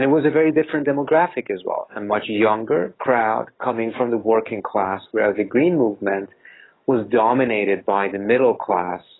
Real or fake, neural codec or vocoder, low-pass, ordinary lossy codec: fake; codec, 44.1 kHz, 7.8 kbps, DAC; 7.2 kHz; AAC, 16 kbps